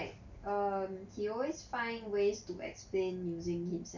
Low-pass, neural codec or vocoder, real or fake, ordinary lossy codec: 7.2 kHz; none; real; none